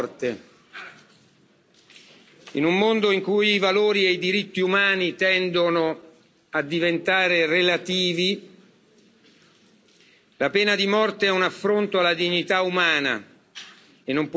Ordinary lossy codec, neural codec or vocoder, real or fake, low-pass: none; none; real; none